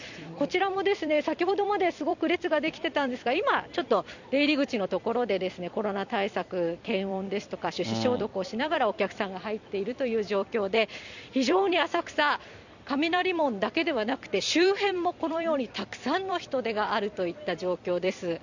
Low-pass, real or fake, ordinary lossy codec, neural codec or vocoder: 7.2 kHz; fake; none; vocoder, 44.1 kHz, 128 mel bands every 256 samples, BigVGAN v2